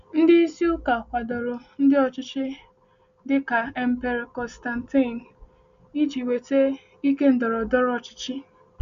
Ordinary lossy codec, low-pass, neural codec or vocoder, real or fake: none; 7.2 kHz; none; real